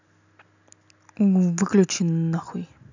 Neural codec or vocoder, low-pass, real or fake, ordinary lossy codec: none; 7.2 kHz; real; none